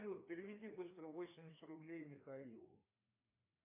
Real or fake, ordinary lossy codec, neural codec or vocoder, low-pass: fake; AAC, 24 kbps; codec, 16 kHz, 2 kbps, FreqCodec, larger model; 3.6 kHz